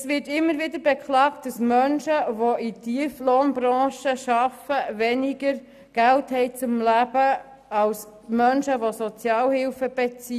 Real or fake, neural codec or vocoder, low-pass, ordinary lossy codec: real; none; 14.4 kHz; none